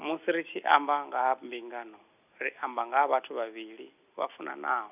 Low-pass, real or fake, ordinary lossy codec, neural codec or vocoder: 3.6 kHz; real; none; none